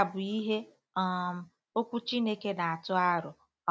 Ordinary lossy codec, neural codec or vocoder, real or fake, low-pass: none; none; real; none